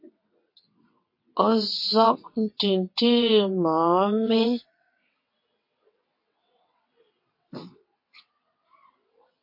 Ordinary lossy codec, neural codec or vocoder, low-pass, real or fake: MP3, 32 kbps; vocoder, 22.05 kHz, 80 mel bands, WaveNeXt; 5.4 kHz; fake